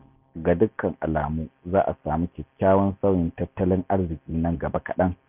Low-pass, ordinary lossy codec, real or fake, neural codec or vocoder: 3.6 kHz; none; real; none